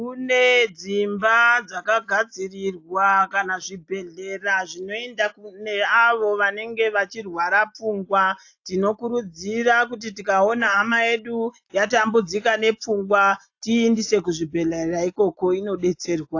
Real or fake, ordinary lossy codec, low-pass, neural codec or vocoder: real; AAC, 48 kbps; 7.2 kHz; none